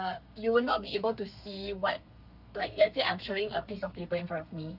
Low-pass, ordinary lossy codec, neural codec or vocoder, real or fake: 5.4 kHz; none; codec, 32 kHz, 1.9 kbps, SNAC; fake